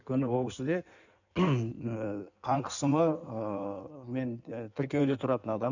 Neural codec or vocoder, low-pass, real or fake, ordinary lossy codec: codec, 16 kHz in and 24 kHz out, 1.1 kbps, FireRedTTS-2 codec; 7.2 kHz; fake; none